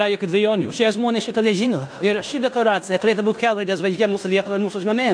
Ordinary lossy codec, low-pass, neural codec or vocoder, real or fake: AAC, 64 kbps; 9.9 kHz; codec, 16 kHz in and 24 kHz out, 0.9 kbps, LongCat-Audio-Codec, fine tuned four codebook decoder; fake